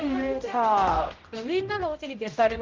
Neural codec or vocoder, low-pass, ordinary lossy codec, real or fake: codec, 16 kHz, 0.5 kbps, X-Codec, HuBERT features, trained on general audio; 7.2 kHz; Opus, 32 kbps; fake